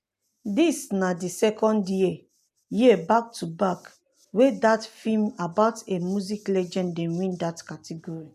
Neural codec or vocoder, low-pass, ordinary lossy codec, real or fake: none; 14.4 kHz; none; real